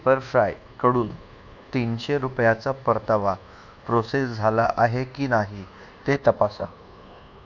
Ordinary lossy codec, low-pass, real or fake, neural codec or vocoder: none; 7.2 kHz; fake; codec, 24 kHz, 1.2 kbps, DualCodec